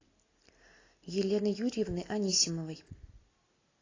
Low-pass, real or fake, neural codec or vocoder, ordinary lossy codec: 7.2 kHz; real; none; AAC, 32 kbps